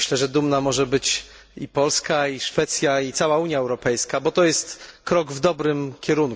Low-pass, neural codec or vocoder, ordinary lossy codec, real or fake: none; none; none; real